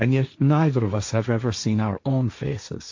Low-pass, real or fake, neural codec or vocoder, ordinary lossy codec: 7.2 kHz; fake; codec, 16 kHz, 1.1 kbps, Voila-Tokenizer; AAC, 48 kbps